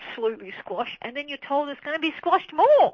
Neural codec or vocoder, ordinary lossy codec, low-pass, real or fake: none; MP3, 32 kbps; 7.2 kHz; real